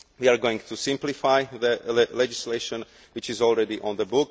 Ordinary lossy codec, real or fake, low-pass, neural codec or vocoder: none; real; none; none